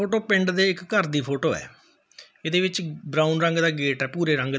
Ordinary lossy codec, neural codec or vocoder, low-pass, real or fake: none; none; none; real